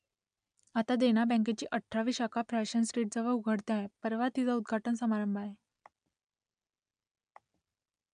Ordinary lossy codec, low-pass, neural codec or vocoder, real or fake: AAC, 96 kbps; 9.9 kHz; none; real